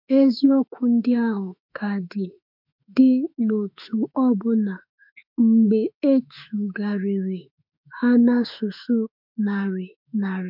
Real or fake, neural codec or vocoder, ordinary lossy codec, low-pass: fake; autoencoder, 48 kHz, 32 numbers a frame, DAC-VAE, trained on Japanese speech; MP3, 48 kbps; 5.4 kHz